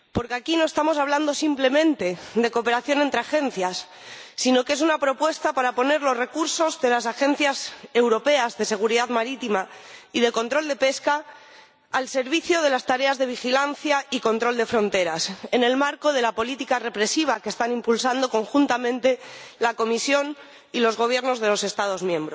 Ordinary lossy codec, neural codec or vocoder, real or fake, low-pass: none; none; real; none